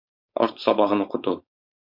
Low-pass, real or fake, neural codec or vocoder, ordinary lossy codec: 5.4 kHz; fake; codec, 16 kHz, 4.8 kbps, FACodec; MP3, 48 kbps